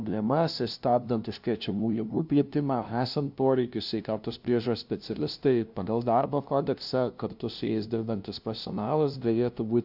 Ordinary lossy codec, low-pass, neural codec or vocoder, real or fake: AAC, 48 kbps; 5.4 kHz; codec, 16 kHz, 0.5 kbps, FunCodec, trained on LibriTTS, 25 frames a second; fake